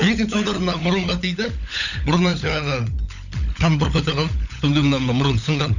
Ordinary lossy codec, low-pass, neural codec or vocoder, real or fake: none; 7.2 kHz; codec, 16 kHz, 16 kbps, FunCodec, trained on LibriTTS, 50 frames a second; fake